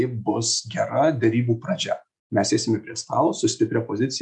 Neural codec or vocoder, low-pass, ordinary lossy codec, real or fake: none; 10.8 kHz; MP3, 96 kbps; real